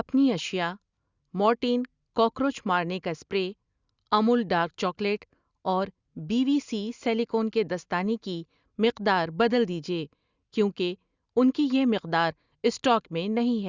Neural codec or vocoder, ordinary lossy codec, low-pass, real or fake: none; Opus, 64 kbps; 7.2 kHz; real